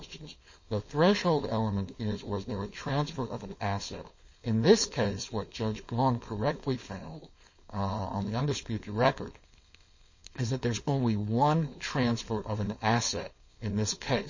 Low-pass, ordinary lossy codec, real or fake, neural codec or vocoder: 7.2 kHz; MP3, 32 kbps; fake; codec, 16 kHz, 4.8 kbps, FACodec